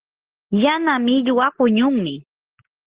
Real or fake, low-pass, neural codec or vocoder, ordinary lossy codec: real; 3.6 kHz; none; Opus, 16 kbps